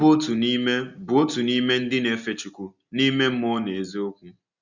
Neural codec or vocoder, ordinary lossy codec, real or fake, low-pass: none; Opus, 64 kbps; real; 7.2 kHz